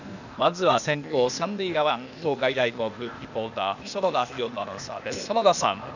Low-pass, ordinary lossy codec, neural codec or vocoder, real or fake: 7.2 kHz; none; codec, 16 kHz, 0.8 kbps, ZipCodec; fake